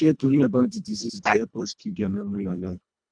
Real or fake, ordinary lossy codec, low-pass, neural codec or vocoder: fake; none; 9.9 kHz; codec, 24 kHz, 1.5 kbps, HILCodec